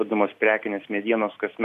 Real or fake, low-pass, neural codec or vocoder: real; 14.4 kHz; none